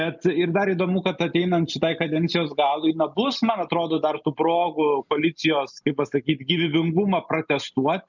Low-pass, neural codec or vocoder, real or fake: 7.2 kHz; none; real